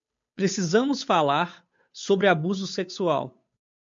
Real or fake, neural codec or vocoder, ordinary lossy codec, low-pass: fake; codec, 16 kHz, 8 kbps, FunCodec, trained on Chinese and English, 25 frames a second; MP3, 48 kbps; 7.2 kHz